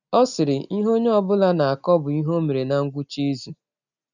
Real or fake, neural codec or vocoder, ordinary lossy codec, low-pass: real; none; none; 7.2 kHz